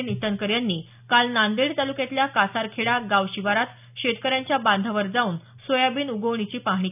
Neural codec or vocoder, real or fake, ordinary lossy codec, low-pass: none; real; none; 3.6 kHz